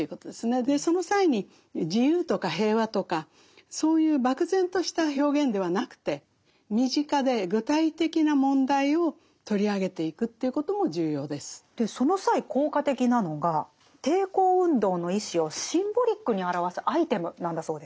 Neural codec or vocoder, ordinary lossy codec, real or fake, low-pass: none; none; real; none